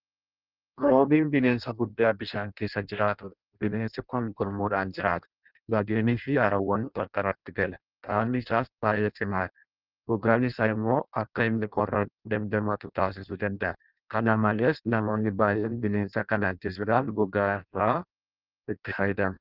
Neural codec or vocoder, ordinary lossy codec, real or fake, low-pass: codec, 16 kHz in and 24 kHz out, 0.6 kbps, FireRedTTS-2 codec; Opus, 24 kbps; fake; 5.4 kHz